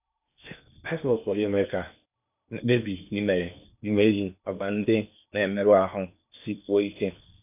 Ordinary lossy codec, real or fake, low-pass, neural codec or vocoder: none; fake; 3.6 kHz; codec, 16 kHz in and 24 kHz out, 0.8 kbps, FocalCodec, streaming, 65536 codes